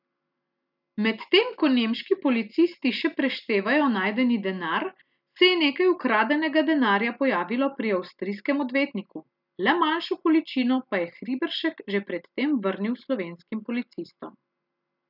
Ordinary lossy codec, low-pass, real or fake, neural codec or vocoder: none; 5.4 kHz; real; none